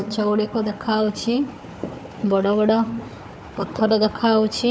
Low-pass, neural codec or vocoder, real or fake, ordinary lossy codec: none; codec, 16 kHz, 4 kbps, FunCodec, trained on Chinese and English, 50 frames a second; fake; none